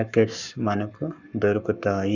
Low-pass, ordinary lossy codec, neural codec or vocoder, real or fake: 7.2 kHz; none; codec, 44.1 kHz, 7.8 kbps, Pupu-Codec; fake